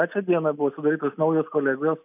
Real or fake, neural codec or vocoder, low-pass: real; none; 3.6 kHz